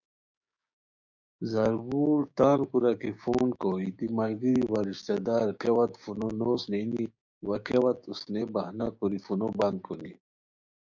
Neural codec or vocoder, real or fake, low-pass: codec, 16 kHz, 6 kbps, DAC; fake; 7.2 kHz